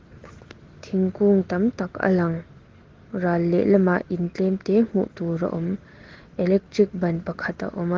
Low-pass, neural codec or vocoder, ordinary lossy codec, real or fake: 7.2 kHz; none; Opus, 16 kbps; real